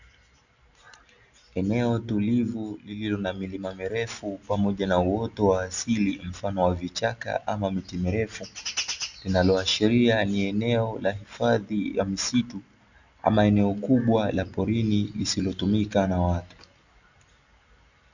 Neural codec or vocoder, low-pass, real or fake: none; 7.2 kHz; real